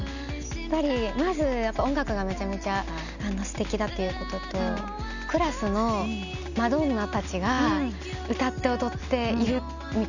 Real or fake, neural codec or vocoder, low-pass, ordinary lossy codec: real; none; 7.2 kHz; none